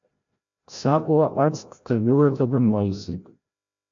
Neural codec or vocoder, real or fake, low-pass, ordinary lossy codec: codec, 16 kHz, 0.5 kbps, FreqCodec, larger model; fake; 7.2 kHz; AAC, 64 kbps